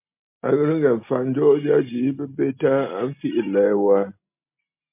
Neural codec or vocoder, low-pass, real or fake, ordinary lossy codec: none; 3.6 kHz; real; MP3, 32 kbps